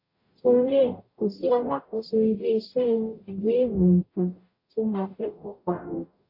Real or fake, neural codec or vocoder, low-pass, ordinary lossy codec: fake; codec, 44.1 kHz, 0.9 kbps, DAC; 5.4 kHz; AAC, 32 kbps